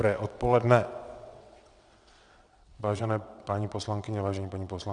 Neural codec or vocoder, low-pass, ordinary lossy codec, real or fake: vocoder, 22.05 kHz, 80 mel bands, WaveNeXt; 9.9 kHz; Opus, 64 kbps; fake